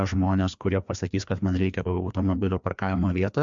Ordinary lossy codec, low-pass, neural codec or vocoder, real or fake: MP3, 64 kbps; 7.2 kHz; codec, 16 kHz, 2 kbps, FreqCodec, larger model; fake